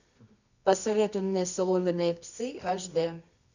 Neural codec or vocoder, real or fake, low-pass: codec, 24 kHz, 0.9 kbps, WavTokenizer, medium music audio release; fake; 7.2 kHz